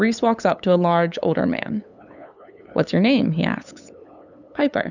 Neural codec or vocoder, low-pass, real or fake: codec, 16 kHz, 8 kbps, FunCodec, trained on LibriTTS, 25 frames a second; 7.2 kHz; fake